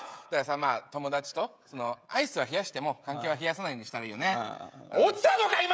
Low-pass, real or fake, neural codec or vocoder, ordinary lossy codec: none; fake; codec, 16 kHz, 16 kbps, FreqCodec, smaller model; none